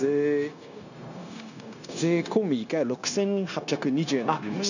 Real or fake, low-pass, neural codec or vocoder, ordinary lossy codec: fake; 7.2 kHz; codec, 16 kHz, 0.9 kbps, LongCat-Audio-Codec; none